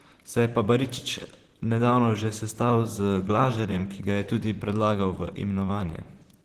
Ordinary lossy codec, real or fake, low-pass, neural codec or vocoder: Opus, 16 kbps; fake; 14.4 kHz; vocoder, 44.1 kHz, 128 mel bands, Pupu-Vocoder